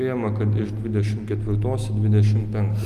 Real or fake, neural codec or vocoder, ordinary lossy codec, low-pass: real; none; Opus, 32 kbps; 14.4 kHz